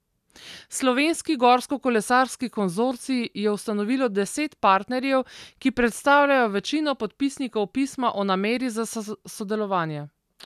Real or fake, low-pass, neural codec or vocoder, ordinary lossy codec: real; 14.4 kHz; none; none